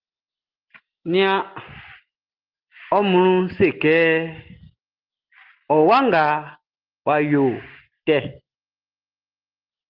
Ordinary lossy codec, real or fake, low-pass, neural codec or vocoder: Opus, 16 kbps; real; 5.4 kHz; none